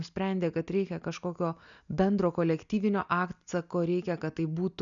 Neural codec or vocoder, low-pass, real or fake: none; 7.2 kHz; real